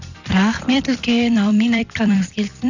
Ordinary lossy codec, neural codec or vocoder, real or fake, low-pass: none; vocoder, 44.1 kHz, 128 mel bands every 256 samples, BigVGAN v2; fake; 7.2 kHz